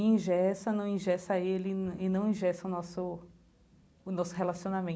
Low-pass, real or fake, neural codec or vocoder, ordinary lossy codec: none; real; none; none